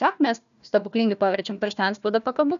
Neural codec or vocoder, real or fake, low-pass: codec, 16 kHz, 1 kbps, FunCodec, trained on Chinese and English, 50 frames a second; fake; 7.2 kHz